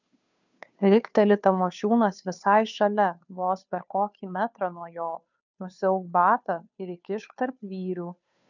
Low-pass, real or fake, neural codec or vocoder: 7.2 kHz; fake; codec, 16 kHz, 2 kbps, FunCodec, trained on Chinese and English, 25 frames a second